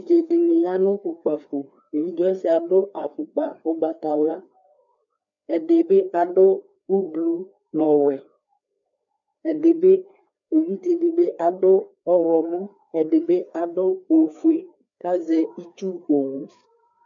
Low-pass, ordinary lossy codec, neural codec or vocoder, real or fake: 7.2 kHz; MP3, 64 kbps; codec, 16 kHz, 2 kbps, FreqCodec, larger model; fake